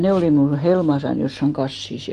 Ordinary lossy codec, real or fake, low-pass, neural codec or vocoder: Opus, 24 kbps; real; 14.4 kHz; none